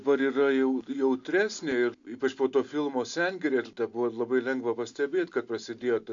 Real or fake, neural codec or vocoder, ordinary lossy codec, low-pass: real; none; AAC, 64 kbps; 7.2 kHz